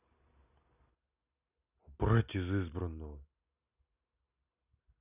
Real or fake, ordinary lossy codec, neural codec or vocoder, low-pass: real; none; none; 3.6 kHz